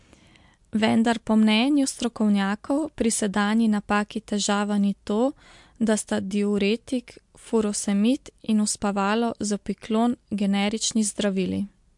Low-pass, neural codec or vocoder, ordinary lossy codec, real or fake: 10.8 kHz; none; MP3, 64 kbps; real